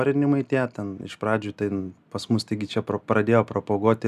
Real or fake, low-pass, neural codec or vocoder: real; 14.4 kHz; none